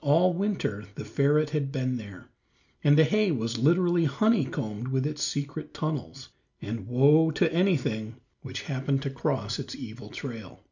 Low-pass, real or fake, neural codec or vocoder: 7.2 kHz; real; none